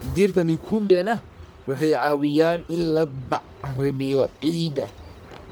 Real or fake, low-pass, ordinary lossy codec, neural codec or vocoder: fake; none; none; codec, 44.1 kHz, 1.7 kbps, Pupu-Codec